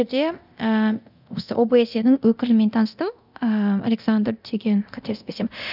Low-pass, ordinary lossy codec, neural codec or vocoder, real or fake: 5.4 kHz; none; codec, 24 kHz, 0.9 kbps, DualCodec; fake